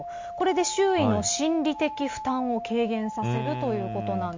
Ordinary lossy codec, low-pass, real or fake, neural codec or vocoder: MP3, 48 kbps; 7.2 kHz; real; none